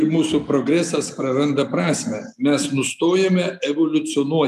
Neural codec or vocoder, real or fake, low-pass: none; real; 14.4 kHz